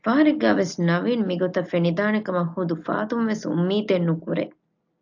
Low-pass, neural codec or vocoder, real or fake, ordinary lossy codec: 7.2 kHz; none; real; AAC, 48 kbps